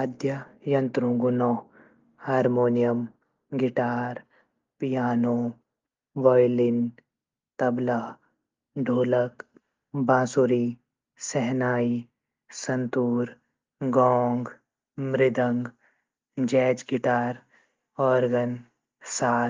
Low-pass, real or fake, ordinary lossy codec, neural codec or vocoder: 7.2 kHz; real; Opus, 32 kbps; none